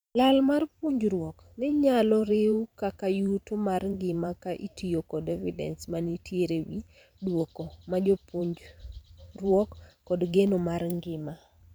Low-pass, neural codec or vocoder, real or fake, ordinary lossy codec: none; vocoder, 44.1 kHz, 128 mel bands every 512 samples, BigVGAN v2; fake; none